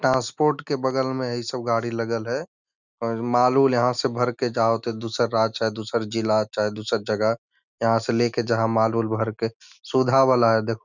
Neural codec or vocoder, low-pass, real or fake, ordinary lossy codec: none; 7.2 kHz; real; none